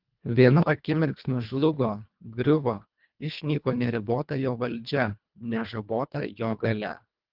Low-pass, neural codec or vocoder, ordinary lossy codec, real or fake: 5.4 kHz; codec, 24 kHz, 1.5 kbps, HILCodec; Opus, 32 kbps; fake